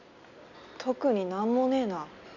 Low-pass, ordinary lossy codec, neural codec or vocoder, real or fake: 7.2 kHz; none; none; real